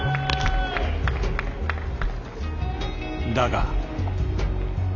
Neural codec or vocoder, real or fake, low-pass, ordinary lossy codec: none; real; 7.2 kHz; none